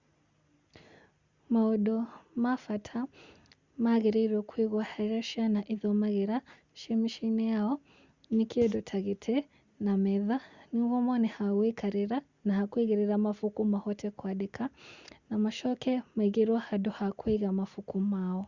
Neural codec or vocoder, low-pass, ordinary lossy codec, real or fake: none; 7.2 kHz; Opus, 64 kbps; real